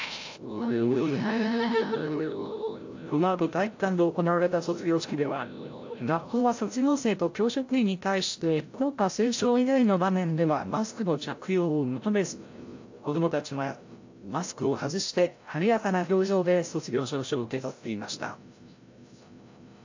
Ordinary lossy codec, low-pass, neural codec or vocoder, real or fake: AAC, 48 kbps; 7.2 kHz; codec, 16 kHz, 0.5 kbps, FreqCodec, larger model; fake